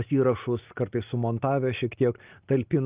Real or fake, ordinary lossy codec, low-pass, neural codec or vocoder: real; Opus, 32 kbps; 3.6 kHz; none